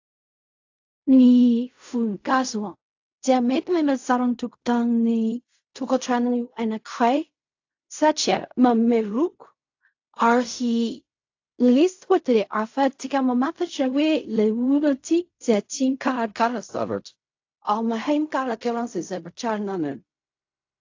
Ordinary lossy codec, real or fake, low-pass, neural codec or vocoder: AAC, 48 kbps; fake; 7.2 kHz; codec, 16 kHz in and 24 kHz out, 0.4 kbps, LongCat-Audio-Codec, fine tuned four codebook decoder